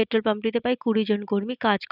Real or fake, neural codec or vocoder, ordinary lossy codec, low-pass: real; none; none; 5.4 kHz